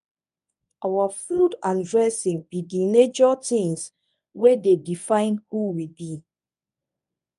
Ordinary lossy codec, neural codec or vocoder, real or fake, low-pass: none; codec, 24 kHz, 0.9 kbps, WavTokenizer, medium speech release version 1; fake; 10.8 kHz